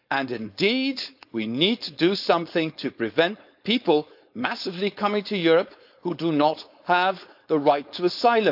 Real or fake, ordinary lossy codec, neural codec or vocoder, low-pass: fake; AAC, 48 kbps; codec, 16 kHz, 4.8 kbps, FACodec; 5.4 kHz